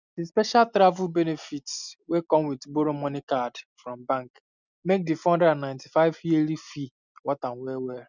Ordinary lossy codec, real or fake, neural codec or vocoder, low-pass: none; real; none; 7.2 kHz